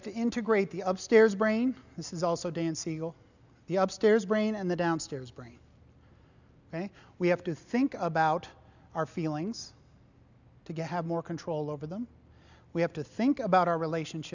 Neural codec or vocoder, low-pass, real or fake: none; 7.2 kHz; real